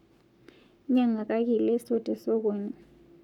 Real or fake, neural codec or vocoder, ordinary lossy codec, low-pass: fake; codec, 44.1 kHz, 7.8 kbps, Pupu-Codec; none; 19.8 kHz